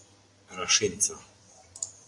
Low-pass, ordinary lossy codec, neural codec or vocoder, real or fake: 10.8 kHz; AAC, 64 kbps; none; real